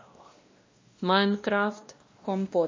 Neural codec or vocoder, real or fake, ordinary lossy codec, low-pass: codec, 16 kHz, 1 kbps, X-Codec, WavLM features, trained on Multilingual LibriSpeech; fake; MP3, 32 kbps; 7.2 kHz